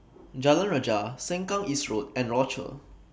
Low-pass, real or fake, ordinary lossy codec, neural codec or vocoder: none; real; none; none